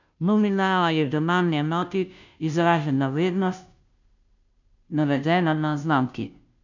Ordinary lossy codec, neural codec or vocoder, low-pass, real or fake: none; codec, 16 kHz, 0.5 kbps, FunCodec, trained on Chinese and English, 25 frames a second; 7.2 kHz; fake